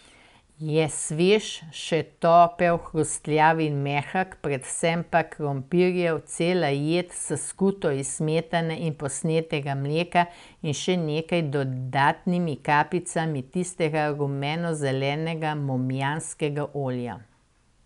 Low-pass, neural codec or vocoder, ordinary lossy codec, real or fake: 10.8 kHz; none; none; real